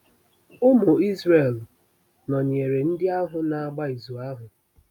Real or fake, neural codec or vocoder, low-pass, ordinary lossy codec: real; none; 19.8 kHz; none